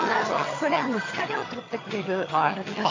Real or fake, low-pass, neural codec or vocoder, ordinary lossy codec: fake; 7.2 kHz; vocoder, 22.05 kHz, 80 mel bands, HiFi-GAN; AAC, 32 kbps